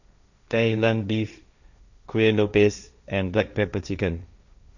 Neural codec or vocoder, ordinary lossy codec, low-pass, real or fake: codec, 16 kHz, 1.1 kbps, Voila-Tokenizer; none; 7.2 kHz; fake